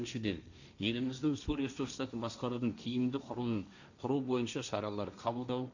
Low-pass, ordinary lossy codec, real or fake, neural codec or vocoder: 7.2 kHz; none; fake; codec, 16 kHz, 1.1 kbps, Voila-Tokenizer